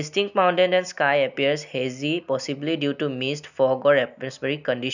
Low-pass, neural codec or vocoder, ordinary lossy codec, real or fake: 7.2 kHz; none; none; real